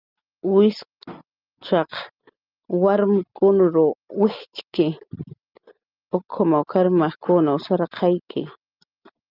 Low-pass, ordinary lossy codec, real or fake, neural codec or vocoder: 5.4 kHz; Opus, 24 kbps; real; none